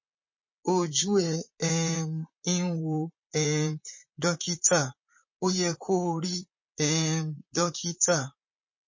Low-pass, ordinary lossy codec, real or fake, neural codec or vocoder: 7.2 kHz; MP3, 32 kbps; fake; vocoder, 44.1 kHz, 128 mel bands, Pupu-Vocoder